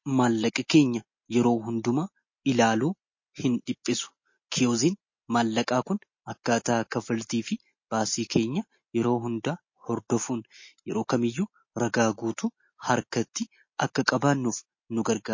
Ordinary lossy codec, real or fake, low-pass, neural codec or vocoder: MP3, 32 kbps; real; 7.2 kHz; none